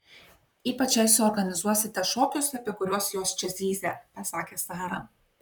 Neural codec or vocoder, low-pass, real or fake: vocoder, 44.1 kHz, 128 mel bands, Pupu-Vocoder; 19.8 kHz; fake